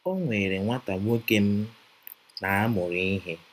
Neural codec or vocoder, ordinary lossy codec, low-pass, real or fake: none; AAC, 96 kbps; 14.4 kHz; real